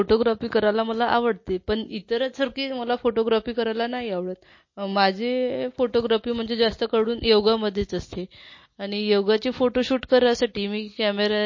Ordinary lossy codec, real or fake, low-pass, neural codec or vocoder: MP3, 32 kbps; real; 7.2 kHz; none